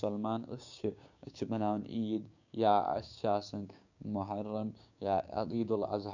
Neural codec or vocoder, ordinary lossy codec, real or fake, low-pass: codec, 16 kHz, 6 kbps, DAC; none; fake; 7.2 kHz